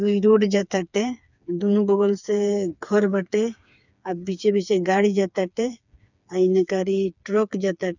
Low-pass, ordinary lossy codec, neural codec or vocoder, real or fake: 7.2 kHz; none; codec, 16 kHz, 4 kbps, FreqCodec, smaller model; fake